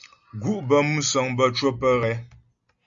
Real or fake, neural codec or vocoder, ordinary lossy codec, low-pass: real; none; Opus, 64 kbps; 7.2 kHz